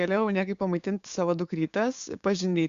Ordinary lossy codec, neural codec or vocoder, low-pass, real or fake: Opus, 64 kbps; codec, 16 kHz, 6 kbps, DAC; 7.2 kHz; fake